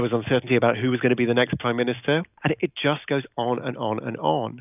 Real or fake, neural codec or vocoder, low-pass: real; none; 3.6 kHz